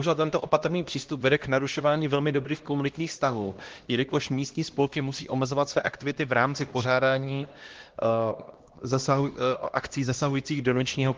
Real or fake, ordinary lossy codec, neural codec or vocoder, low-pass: fake; Opus, 16 kbps; codec, 16 kHz, 1 kbps, X-Codec, HuBERT features, trained on LibriSpeech; 7.2 kHz